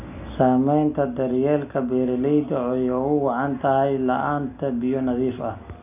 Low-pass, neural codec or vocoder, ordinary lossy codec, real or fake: 3.6 kHz; none; none; real